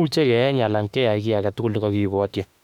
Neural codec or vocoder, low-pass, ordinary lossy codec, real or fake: autoencoder, 48 kHz, 32 numbers a frame, DAC-VAE, trained on Japanese speech; 19.8 kHz; none; fake